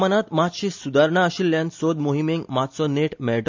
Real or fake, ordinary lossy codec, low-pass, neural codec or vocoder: real; MP3, 64 kbps; 7.2 kHz; none